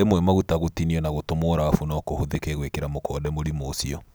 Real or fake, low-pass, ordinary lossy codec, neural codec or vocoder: real; none; none; none